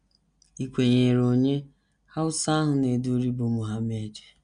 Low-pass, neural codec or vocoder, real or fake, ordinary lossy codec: 9.9 kHz; none; real; none